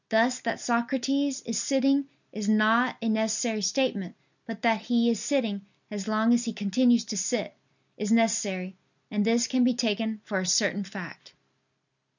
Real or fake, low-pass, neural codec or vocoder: real; 7.2 kHz; none